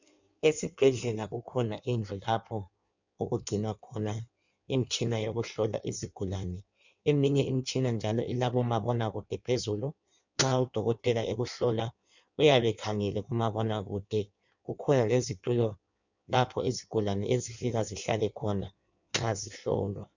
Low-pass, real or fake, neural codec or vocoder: 7.2 kHz; fake; codec, 16 kHz in and 24 kHz out, 1.1 kbps, FireRedTTS-2 codec